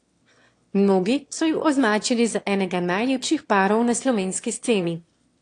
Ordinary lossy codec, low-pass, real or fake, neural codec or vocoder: AAC, 48 kbps; 9.9 kHz; fake; autoencoder, 22.05 kHz, a latent of 192 numbers a frame, VITS, trained on one speaker